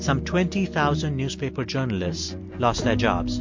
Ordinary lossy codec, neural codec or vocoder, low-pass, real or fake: MP3, 48 kbps; none; 7.2 kHz; real